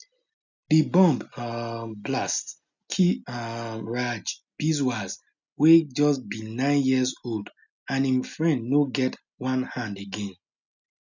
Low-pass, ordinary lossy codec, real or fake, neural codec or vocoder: 7.2 kHz; none; real; none